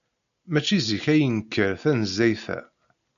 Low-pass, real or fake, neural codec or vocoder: 7.2 kHz; real; none